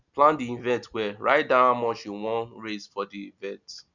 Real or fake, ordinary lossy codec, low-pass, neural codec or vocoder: real; none; 7.2 kHz; none